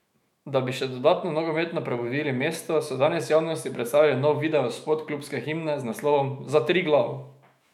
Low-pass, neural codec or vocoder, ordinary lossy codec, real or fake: 19.8 kHz; autoencoder, 48 kHz, 128 numbers a frame, DAC-VAE, trained on Japanese speech; MP3, 96 kbps; fake